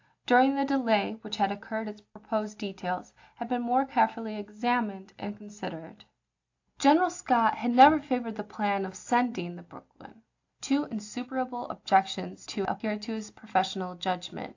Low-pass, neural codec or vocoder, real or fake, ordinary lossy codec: 7.2 kHz; none; real; AAC, 48 kbps